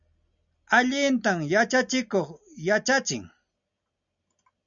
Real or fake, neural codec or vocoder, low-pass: real; none; 7.2 kHz